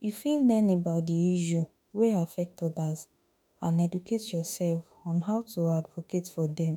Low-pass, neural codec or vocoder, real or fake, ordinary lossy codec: none; autoencoder, 48 kHz, 32 numbers a frame, DAC-VAE, trained on Japanese speech; fake; none